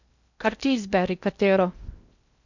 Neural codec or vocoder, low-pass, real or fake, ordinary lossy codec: codec, 16 kHz in and 24 kHz out, 0.6 kbps, FocalCodec, streaming, 2048 codes; 7.2 kHz; fake; none